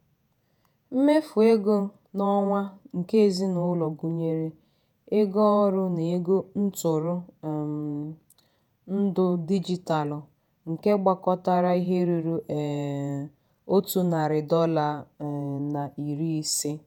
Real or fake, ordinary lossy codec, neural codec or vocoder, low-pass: fake; none; vocoder, 48 kHz, 128 mel bands, Vocos; 19.8 kHz